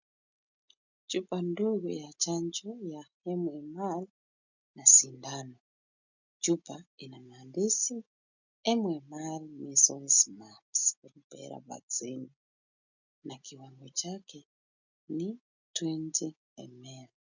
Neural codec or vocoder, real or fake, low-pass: none; real; 7.2 kHz